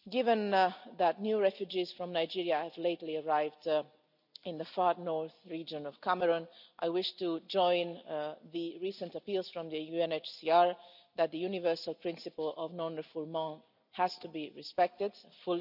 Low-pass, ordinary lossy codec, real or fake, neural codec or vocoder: 5.4 kHz; none; real; none